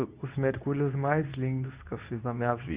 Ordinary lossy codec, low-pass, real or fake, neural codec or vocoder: Opus, 64 kbps; 3.6 kHz; fake; codec, 24 kHz, 0.9 kbps, WavTokenizer, medium speech release version 2